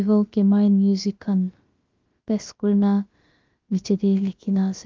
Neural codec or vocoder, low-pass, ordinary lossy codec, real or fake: codec, 16 kHz, about 1 kbps, DyCAST, with the encoder's durations; 7.2 kHz; Opus, 32 kbps; fake